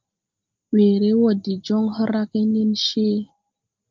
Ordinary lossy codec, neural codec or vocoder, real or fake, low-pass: Opus, 24 kbps; none; real; 7.2 kHz